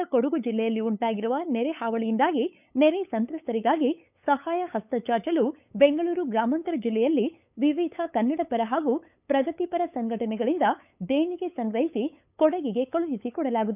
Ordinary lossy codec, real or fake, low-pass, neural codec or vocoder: none; fake; 3.6 kHz; codec, 16 kHz, 8 kbps, FunCodec, trained on LibriTTS, 25 frames a second